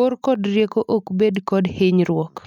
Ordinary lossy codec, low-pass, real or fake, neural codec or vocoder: none; 19.8 kHz; real; none